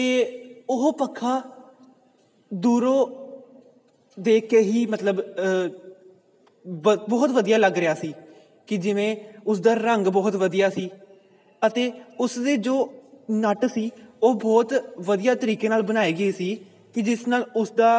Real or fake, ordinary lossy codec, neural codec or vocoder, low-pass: real; none; none; none